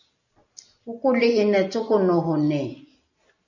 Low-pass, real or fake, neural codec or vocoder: 7.2 kHz; real; none